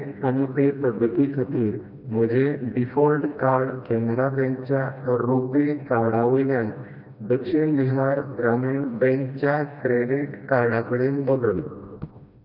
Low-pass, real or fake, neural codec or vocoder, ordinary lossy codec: 5.4 kHz; fake; codec, 16 kHz, 1 kbps, FreqCodec, smaller model; none